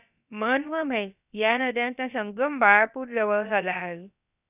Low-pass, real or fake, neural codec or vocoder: 3.6 kHz; fake; codec, 16 kHz, about 1 kbps, DyCAST, with the encoder's durations